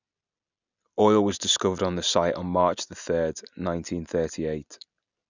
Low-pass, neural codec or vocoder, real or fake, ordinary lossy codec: 7.2 kHz; none; real; none